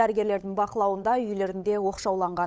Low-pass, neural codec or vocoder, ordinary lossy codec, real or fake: none; codec, 16 kHz, 8 kbps, FunCodec, trained on Chinese and English, 25 frames a second; none; fake